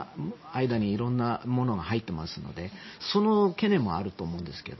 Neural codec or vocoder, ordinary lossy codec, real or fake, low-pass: none; MP3, 24 kbps; real; 7.2 kHz